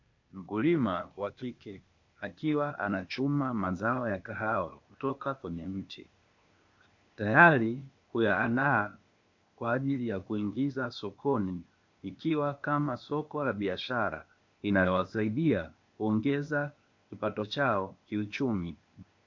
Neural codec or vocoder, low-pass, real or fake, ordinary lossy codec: codec, 16 kHz, 0.8 kbps, ZipCodec; 7.2 kHz; fake; MP3, 48 kbps